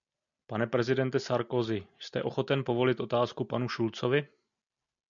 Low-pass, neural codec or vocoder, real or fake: 7.2 kHz; none; real